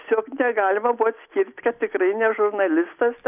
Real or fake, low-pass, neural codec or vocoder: real; 3.6 kHz; none